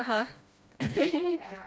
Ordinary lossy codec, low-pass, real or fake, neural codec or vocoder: none; none; fake; codec, 16 kHz, 1 kbps, FreqCodec, smaller model